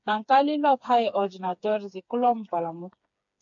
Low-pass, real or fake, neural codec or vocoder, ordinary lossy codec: 7.2 kHz; fake; codec, 16 kHz, 4 kbps, FreqCodec, smaller model; AAC, 64 kbps